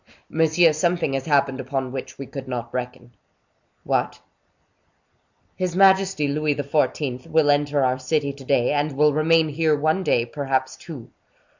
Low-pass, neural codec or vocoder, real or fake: 7.2 kHz; none; real